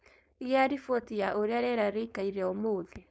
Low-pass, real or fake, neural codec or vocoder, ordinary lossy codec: none; fake; codec, 16 kHz, 4.8 kbps, FACodec; none